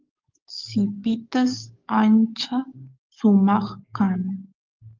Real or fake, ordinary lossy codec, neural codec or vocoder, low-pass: fake; Opus, 32 kbps; codec, 44.1 kHz, 7.8 kbps, DAC; 7.2 kHz